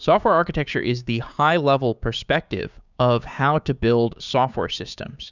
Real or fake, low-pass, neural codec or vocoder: fake; 7.2 kHz; codec, 16 kHz, 6 kbps, DAC